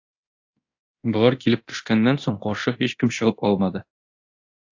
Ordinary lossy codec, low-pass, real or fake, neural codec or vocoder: AAC, 48 kbps; 7.2 kHz; fake; codec, 24 kHz, 0.9 kbps, DualCodec